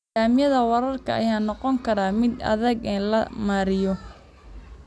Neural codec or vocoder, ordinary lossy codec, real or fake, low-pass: none; none; real; none